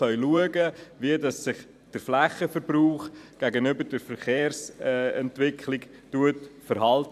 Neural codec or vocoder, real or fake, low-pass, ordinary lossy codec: vocoder, 44.1 kHz, 128 mel bands every 512 samples, BigVGAN v2; fake; 14.4 kHz; none